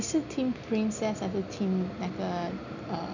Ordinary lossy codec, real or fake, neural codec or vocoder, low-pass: none; real; none; 7.2 kHz